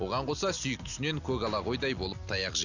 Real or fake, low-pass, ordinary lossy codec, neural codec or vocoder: real; 7.2 kHz; none; none